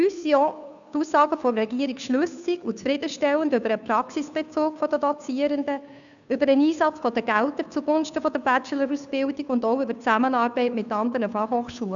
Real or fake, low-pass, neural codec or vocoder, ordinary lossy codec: fake; 7.2 kHz; codec, 16 kHz, 2 kbps, FunCodec, trained on Chinese and English, 25 frames a second; none